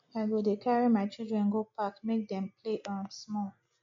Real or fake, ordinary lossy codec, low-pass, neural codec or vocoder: real; MP3, 48 kbps; 7.2 kHz; none